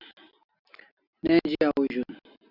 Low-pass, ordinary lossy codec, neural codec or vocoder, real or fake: 5.4 kHz; Opus, 64 kbps; none; real